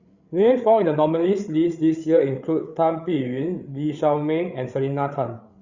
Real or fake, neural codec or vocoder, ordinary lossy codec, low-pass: fake; codec, 16 kHz, 8 kbps, FreqCodec, larger model; Opus, 64 kbps; 7.2 kHz